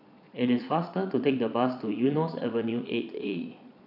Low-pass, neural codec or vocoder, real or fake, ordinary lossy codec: 5.4 kHz; vocoder, 22.05 kHz, 80 mel bands, Vocos; fake; none